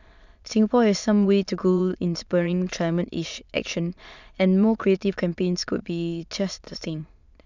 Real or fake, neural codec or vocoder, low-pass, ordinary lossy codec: fake; autoencoder, 22.05 kHz, a latent of 192 numbers a frame, VITS, trained on many speakers; 7.2 kHz; none